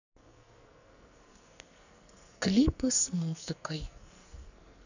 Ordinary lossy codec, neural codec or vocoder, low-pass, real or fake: none; codec, 44.1 kHz, 2.6 kbps, SNAC; 7.2 kHz; fake